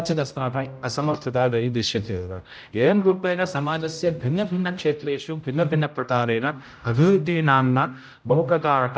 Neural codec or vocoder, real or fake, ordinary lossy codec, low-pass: codec, 16 kHz, 0.5 kbps, X-Codec, HuBERT features, trained on general audio; fake; none; none